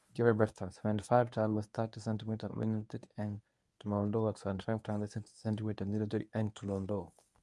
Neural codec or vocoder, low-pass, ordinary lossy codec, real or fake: codec, 24 kHz, 0.9 kbps, WavTokenizer, medium speech release version 1; none; none; fake